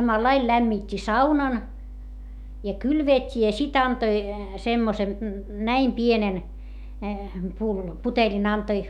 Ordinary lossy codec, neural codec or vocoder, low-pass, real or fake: none; autoencoder, 48 kHz, 128 numbers a frame, DAC-VAE, trained on Japanese speech; 19.8 kHz; fake